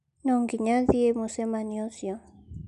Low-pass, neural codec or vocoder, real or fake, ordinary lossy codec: 10.8 kHz; none; real; AAC, 96 kbps